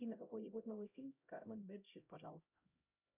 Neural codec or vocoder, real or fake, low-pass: codec, 16 kHz, 0.5 kbps, X-Codec, HuBERT features, trained on LibriSpeech; fake; 3.6 kHz